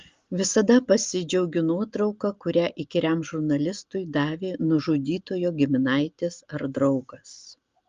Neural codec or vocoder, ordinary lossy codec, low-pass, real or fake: none; Opus, 32 kbps; 7.2 kHz; real